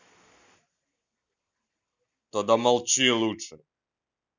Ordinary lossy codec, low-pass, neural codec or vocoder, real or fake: none; none; none; real